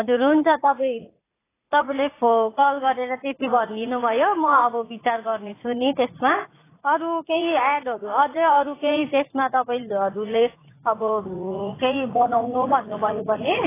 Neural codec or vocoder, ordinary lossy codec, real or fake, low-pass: vocoder, 44.1 kHz, 80 mel bands, Vocos; AAC, 16 kbps; fake; 3.6 kHz